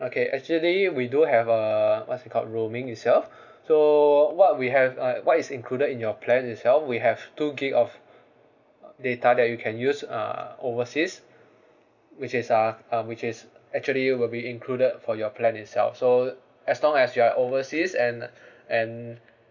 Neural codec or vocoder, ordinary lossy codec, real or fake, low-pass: none; none; real; 7.2 kHz